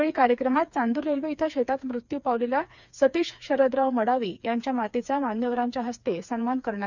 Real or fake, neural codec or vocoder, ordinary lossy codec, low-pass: fake; codec, 16 kHz, 4 kbps, FreqCodec, smaller model; none; 7.2 kHz